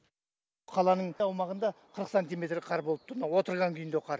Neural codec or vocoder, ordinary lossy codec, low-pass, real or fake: none; none; none; real